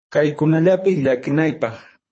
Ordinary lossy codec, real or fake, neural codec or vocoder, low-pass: MP3, 32 kbps; fake; codec, 24 kHz, 3 kbps, HILCodec; 9.9 kHz